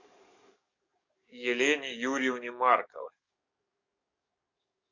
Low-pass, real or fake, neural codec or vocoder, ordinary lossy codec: 7.2 kHz; fake; codec, 44.1 kHz, 7.8 kbps, DAC; Opus, 64 kbps